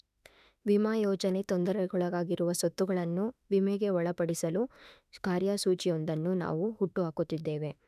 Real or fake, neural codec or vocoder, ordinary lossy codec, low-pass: fake; autoencoder, 48 kHz, 32 numbers a frame, DAC-VAE, trained on Japanese speech; none; 14.4 kHz